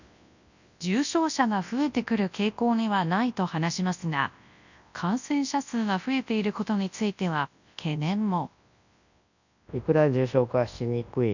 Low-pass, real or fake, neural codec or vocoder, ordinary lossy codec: 7.2 kHz; fake; codec, 24 kHz, 0.9 kbps, WavTokenizer, large speech release; none